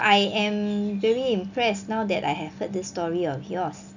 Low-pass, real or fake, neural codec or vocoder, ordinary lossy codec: 7.2 kHz; real; none; none